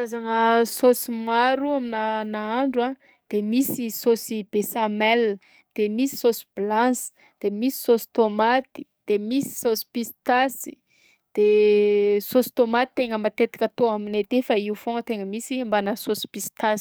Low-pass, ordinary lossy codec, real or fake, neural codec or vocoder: none; none; fake; codec, 44.1 kHz, 7.8 kbps, DAC